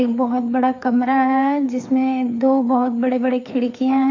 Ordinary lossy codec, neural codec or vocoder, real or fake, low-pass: AAC, 48 kbps; codec, 16 kHz, 4 kbps, FreqCodec, larger model; fake; 7.2 kHz